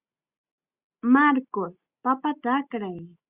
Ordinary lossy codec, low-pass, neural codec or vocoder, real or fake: Opus, 64 kbps; 3.6 kHz; none; real